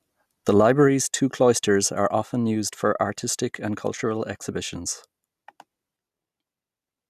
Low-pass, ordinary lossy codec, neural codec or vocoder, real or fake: 14.4 kHz; none; none; real